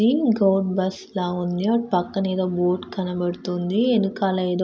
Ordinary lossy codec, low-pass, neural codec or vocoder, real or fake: none; none; none; real